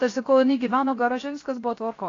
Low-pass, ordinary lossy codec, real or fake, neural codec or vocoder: 7.2 kHz; AAC, 32 kbps; fake; codec, 16 kHz, 0.7 kbps, FocalCodec